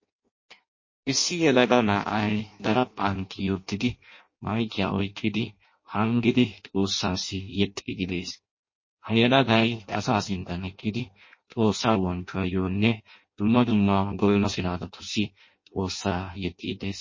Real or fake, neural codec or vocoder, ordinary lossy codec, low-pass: fake; codec, 16 kHz in and 24 kHz out, 0.6 kbps, FireRedTTS-2 codec; MP3, 32 kbps; 7.2 kHz